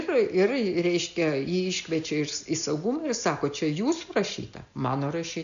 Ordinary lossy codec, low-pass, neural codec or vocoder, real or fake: AAC, 96 kbps; 7.2 kHz; none; real